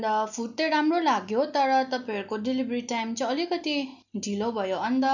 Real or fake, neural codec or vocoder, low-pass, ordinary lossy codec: real; none; 7.2 kHz; none